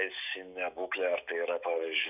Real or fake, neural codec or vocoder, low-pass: fake; autoencoder, 48 kHz, 128 numbers a frame, DAC-VAE, trained on Japanese speech; 3.6 kHz